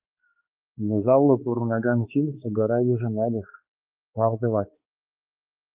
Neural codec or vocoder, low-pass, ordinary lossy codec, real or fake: codec, 16 kHz, 2 kbps, X-Codec, HuBERT features, trained on balanced general audio; 3.6 kHz; Opus, 32 kbps; fake